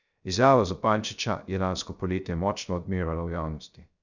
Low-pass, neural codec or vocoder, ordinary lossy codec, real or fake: 7.2 kHz; codec, 16 kHz, 0.3 kbps, FocalCodec; none; fake